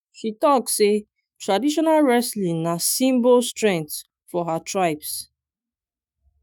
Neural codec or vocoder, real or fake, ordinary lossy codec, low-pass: autoencoder, 48 kHz, 128 numbers a frame, DAC-VAE, trained on Japanese speech; fake; none; none